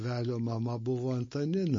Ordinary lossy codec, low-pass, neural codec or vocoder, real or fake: MP3, 32 kbps; 7.2 kHz; none; real